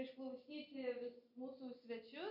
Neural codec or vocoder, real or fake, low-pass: none; real; 5.4 kHz